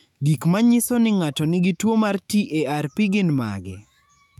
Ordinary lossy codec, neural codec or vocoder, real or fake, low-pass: none; autoencoder, 48 kHz, 128 numbers a frame, DAC-VAE, trained on Japanese speech; fake; 19.8 kHz